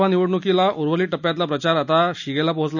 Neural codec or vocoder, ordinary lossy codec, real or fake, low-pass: none; none; real; 7.2 kHz